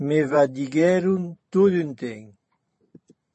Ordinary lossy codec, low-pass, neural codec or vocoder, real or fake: MP3, 32 kbps; 10.8 kHz; vocoder, 44.1 kHz, 128 mel bands every 512 samples, BigVGAN v2; fake